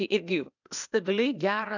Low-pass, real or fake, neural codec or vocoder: 7.2 kHz; fake; codec, 16 kHz, 0.8 kbps, ZipCodec